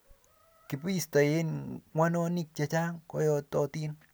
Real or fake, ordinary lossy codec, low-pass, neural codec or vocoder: real; none; none; none